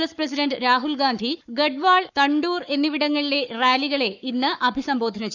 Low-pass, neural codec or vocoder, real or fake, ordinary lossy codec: 7.2 kHz; codec, 44.1 kHz, 7.8 kbps, Pupu-Codec; fake; none